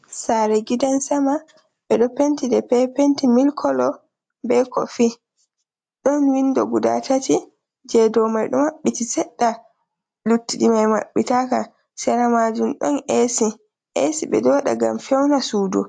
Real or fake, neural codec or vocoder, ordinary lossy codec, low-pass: real; none; AAC, 64 kbps; 9.9 kHz